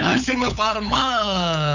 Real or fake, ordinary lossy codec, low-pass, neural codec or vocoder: fake; none; 7.2 kHz; codec, 16 kHz, 8 kbps, FunCodec, trained on LibriTTS, 25 frames a second